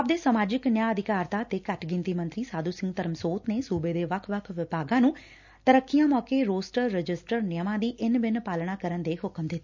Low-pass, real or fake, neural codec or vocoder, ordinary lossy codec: 7.2 kHz; real; none; none